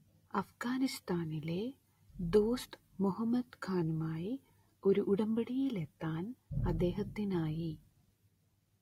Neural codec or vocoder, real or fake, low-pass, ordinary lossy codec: none; real; 19.8 kHz; AAC, 48 kbps